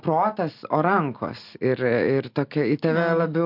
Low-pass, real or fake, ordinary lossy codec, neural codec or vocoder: 5.4 kHz; real; MP3, 48 kbps; none